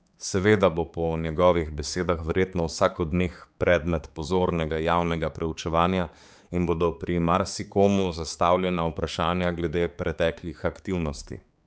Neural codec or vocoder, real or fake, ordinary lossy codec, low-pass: codec, 16 kHz, 4 kbps, X-Codec, HuBERT features, trained on balanced general audio; fake; none; none